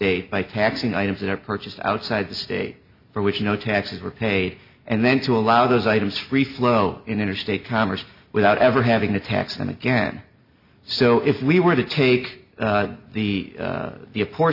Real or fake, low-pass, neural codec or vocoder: real; 5.4 kHz; none